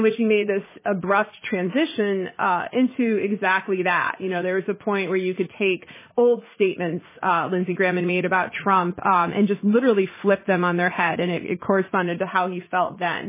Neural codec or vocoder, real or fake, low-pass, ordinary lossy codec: vocoder, 44.1 kHz, 80 mel bands, Vocos; fake; 3.6 kHz; MP3, 16 kbps